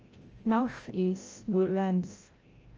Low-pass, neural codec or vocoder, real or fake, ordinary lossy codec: 7.2 kHz; codec, 16 kHz, 0.5 kbps, FreqCodec, larger model; fake; Opus, 24 kbps